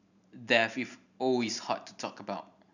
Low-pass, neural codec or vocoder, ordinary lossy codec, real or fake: 7.2 kHz; vocoder, 44.1 kHz, 128 mel bands every 512 samples, BigVGAN v2; none; fake